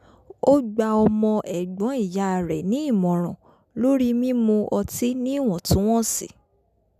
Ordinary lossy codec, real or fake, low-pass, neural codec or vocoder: none; real; 14.4 kHz; none